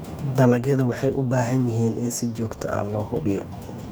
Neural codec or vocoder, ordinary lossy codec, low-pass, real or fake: codec, 44.1 kHz, 2.6 kbps, DAC; none; none; fake